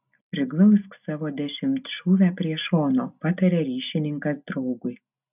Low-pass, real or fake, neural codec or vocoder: 3.6 kHz; real; none